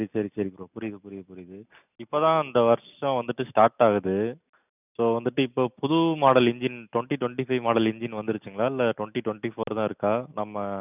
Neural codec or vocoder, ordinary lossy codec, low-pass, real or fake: none; none; 3.6 kHz; real